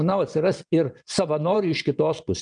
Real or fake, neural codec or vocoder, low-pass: fake; vocoder, 22.05 kHz, 80 mel bands, WaveNeXt; 9.9 kHz